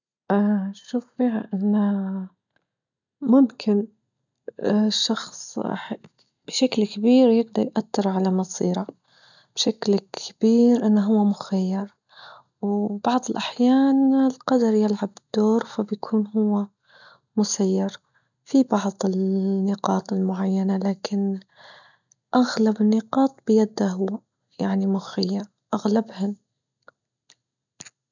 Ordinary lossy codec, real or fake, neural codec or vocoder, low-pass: none; real; none; 7.2 kHz